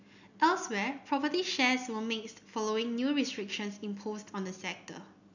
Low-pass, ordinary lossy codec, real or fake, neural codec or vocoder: 7.2 kHz; none; real; none